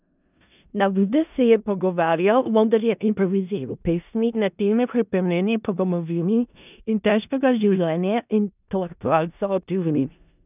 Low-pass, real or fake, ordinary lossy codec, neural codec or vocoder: 3.6 kHz; fake; none; codec, 16 kHz in and 24 kHz out, 0.4 kbps, LongCat-Audio-Codec, four codebook decoder